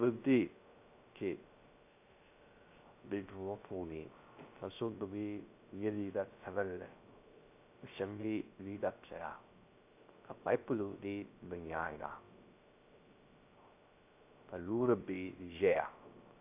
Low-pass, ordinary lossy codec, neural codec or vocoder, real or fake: 3.6 kHz; AAC, 32 kbps; codec, 16 kHz, 0.3 kbps, FocalCodec; fake